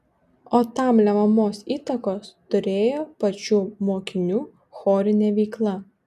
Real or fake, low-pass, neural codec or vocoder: real; 14.4 kHz; none